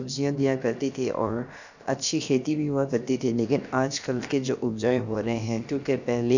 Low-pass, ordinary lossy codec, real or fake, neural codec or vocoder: 7.2 kHz; none; fake; codec, 16 kHz, about 1 kbps, DyCAST, with the encoder's durations